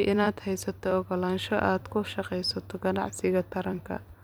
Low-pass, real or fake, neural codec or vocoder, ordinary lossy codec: none; fake; vocoder, 44.1 kHz, 128 mel bands every 256 samples, BigVGAN v2; none